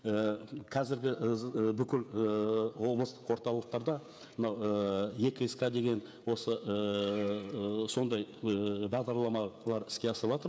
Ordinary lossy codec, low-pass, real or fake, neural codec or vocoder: none; none; fake; codec, 16 kHz, 16 kbps, FreqCodec, smaller model